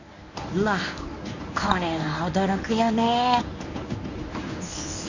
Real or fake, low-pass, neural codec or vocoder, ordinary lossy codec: fake; 7.2 kHz; codec, 24 kHz, 0.9 kbps, WavTokenizer, medium speech release version 1; none